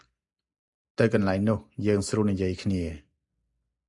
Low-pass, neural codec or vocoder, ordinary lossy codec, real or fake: 10.8 kHz; none; MP3, 96 kbps; real